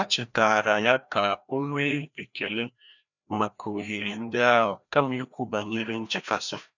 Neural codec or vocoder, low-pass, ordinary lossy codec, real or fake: codec, 16 kHz, 1 kbps, FreqCodec, larger model; 7.2 kHz; none; fake